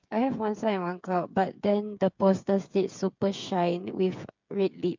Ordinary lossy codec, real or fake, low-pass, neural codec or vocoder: MP3, 64 kbps; fake; 7.2 kHz; codec, 16 kHz, 8 kbps, FreqCodec, smaller model